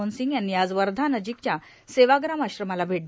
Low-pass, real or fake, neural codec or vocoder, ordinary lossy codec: none; real; none; none